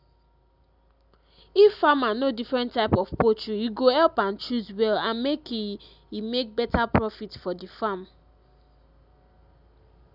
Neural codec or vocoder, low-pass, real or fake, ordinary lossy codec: none; 5.4 kHz; real; none